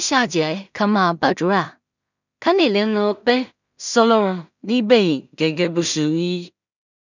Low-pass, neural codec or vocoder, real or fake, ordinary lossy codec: 7.2 kHz; codec, 16 kHz in and 24 kHz out, 0.4 kbps, LongCat-Audio-Codec, two codebook decoder; fake; none